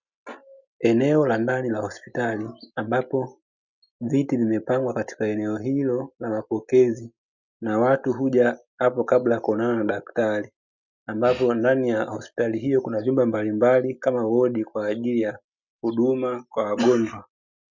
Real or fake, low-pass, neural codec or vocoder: real; 7.2 kHz; none